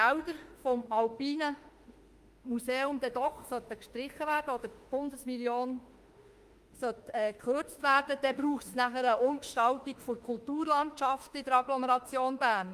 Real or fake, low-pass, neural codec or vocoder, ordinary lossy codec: fake; 14.4 kHz; autoencoder, 48 kHz, 32 numbers a frame, DAC-VAE, trained on Japanese speech; Opus, 32 kbps